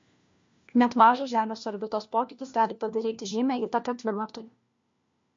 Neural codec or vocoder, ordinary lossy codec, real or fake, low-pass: codec, 16 kHz, 1 kbps, FunCodec, trained on LibriTTS, 50 frames a second; MP3, 48 kbps; fake; 7.2 kHz